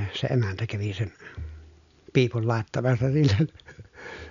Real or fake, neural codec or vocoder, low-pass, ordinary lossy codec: real; none; 7.2 kHz; none